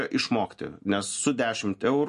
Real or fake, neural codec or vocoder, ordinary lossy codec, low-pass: real; none; MP3, 48 kbps; 14.4 kHz